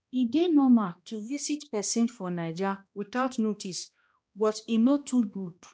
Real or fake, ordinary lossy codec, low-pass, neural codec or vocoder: fake; none; none; codec, 16 kHz, 1 kbps, X-Codec, HuBERT features, trained on balanced general audio